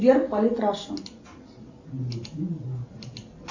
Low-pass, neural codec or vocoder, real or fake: 7.2 kHz; none; real